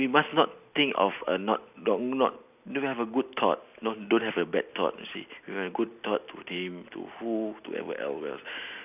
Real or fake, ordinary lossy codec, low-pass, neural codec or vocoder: real; none; 3.6 kHz; none